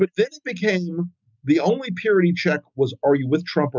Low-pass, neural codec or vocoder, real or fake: 7.2 kHz; none; real